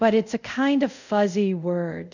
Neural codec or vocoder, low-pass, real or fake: codec, 24 kHz, 0.5 kbps, DualCodec; 7.2 kHz; fake